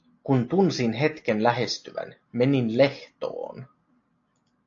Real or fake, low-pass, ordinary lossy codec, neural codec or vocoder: real; 7.2 kHz; AAC, 48 kbps; none